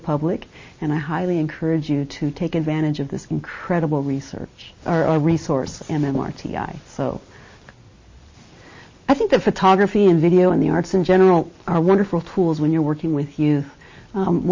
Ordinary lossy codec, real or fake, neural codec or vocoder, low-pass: MP3, 32 kbps; real; none; 7.2 kHz